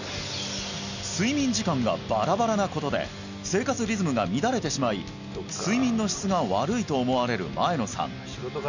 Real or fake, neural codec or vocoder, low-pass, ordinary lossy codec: real; none; 7.2 kHz; none